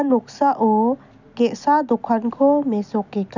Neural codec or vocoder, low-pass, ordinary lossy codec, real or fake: none; 7.2 kHz; none; real